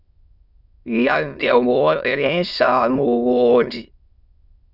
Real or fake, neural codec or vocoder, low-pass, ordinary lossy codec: fake; autoencoder, 22.05 kHz, a latent of 192 numbers a frame, VITS, trained on many speakers; 5.4 kHz; Opus, 64 kbps